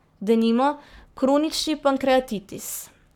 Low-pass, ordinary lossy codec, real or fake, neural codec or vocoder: 19.8 kHz; none; fake; codec, 44.1 kHz, 7.8 kbps, Pupu-Codec